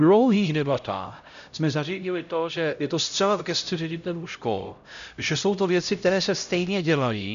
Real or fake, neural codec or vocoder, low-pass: fake; codec, 16 kHz, 0.5 kbps, X-Codec, HuBERT features, trained on LibriSpeech; 7.2 kHz